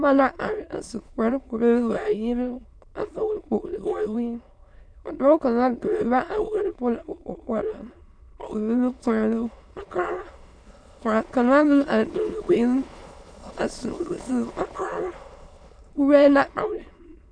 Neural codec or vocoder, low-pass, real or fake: autoencoder, 22.05 kHz, a latent of 192 numbers a frame, VITS, trained on many speakers; 9.9 kHz; fake